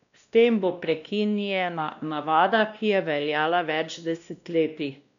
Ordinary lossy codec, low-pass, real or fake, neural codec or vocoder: none; 7.2 kHz; fake; codec, 16 kHz, 1 kbps, X-Codec, WavLM features, trained on Multilingual LibriSpeech